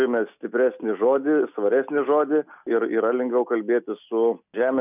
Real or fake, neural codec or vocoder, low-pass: real; none; 3.6 kHz